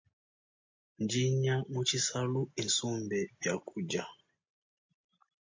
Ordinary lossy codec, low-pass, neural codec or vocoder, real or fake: MP3, 48 kbps; 7.2 kHz; none; real